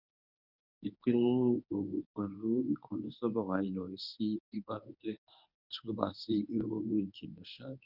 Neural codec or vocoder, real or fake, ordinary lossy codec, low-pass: codec, 24 kHz, 0.9 kbps, WavTokenizer, medium speech release version 1; fake; none; 5.4 kHz